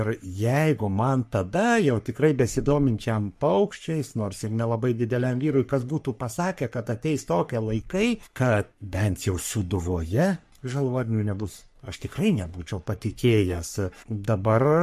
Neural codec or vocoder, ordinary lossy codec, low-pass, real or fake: codec, 44.1 kHz, 3.4 kbps, Pupu-Codec; MP3, 64 kbps; 14.4 kHz; fake